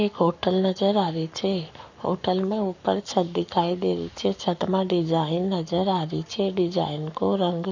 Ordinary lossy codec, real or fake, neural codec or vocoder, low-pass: none; fake; codec, 44.1 kHz, 7.8 kbps, Pupu-Codec; 7.2 kHz